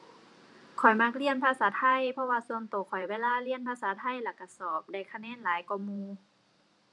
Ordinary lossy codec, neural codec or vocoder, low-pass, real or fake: none; vocoder, 44.1 kHz, 128 mel bands every 512 samples, BigVGAN v2; 10.8 kHz; fake